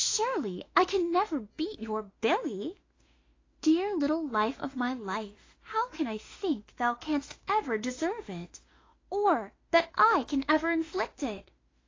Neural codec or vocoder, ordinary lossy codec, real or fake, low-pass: autoencoder, 48 kHz, 32 numbers a frame, DAC-VAE, trained on Japanese speech; AAC, 32 kbps; fake; 7.2 kHz